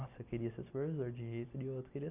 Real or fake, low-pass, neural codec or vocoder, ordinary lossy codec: real; 3.6 kHz; none; none